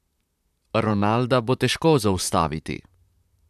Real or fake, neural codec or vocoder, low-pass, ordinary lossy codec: fake; vocoder, 44.1 kHz, 128 mel bands, Pupu-Vocoder; 14.4 kHz; none